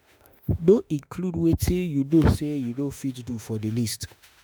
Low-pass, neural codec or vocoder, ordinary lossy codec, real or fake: none; autoencoder, 48 kHz, 32 numbers a frame, DAC-VAE, trained on Japanese speech; none; fake